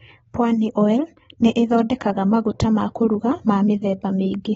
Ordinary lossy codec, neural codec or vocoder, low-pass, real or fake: AAC, 24 kbps; none; 19.8 kHz; real